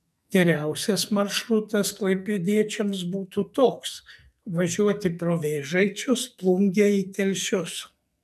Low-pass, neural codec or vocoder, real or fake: 14.4 kHz; codec, 44.1 kHz, 2.6 kbps, SNAC; fake